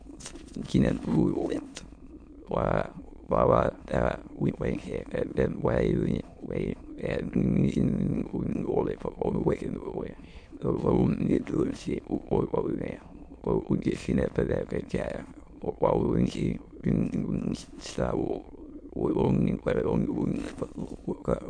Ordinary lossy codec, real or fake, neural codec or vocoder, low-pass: MP3, 64 kbps; fake; autoencoder, 22.05 kHz, a latent of 192 numbers a frame, VITS, trained on many speakers; 9.9 kHz